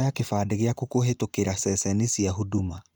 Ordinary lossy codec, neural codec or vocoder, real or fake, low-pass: none; none; real; none